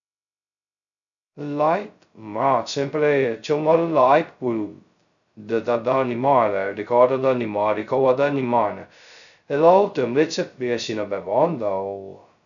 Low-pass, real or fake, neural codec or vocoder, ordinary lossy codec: 7.2 kHz; fake; codec, 16 kHz, 0.2 kbps, FocalCodec; none